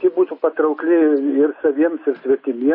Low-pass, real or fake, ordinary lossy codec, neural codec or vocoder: 5.4 kHz; real; MP3, 24 kbps; none